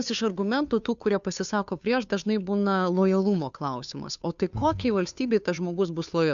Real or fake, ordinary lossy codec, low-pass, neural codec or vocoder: fake; AAC, 96 kbps; 7.2 kHz; codec, 16 kHz, 2 kbps, FunCodec, trained on Chinese and English, 25 frames a second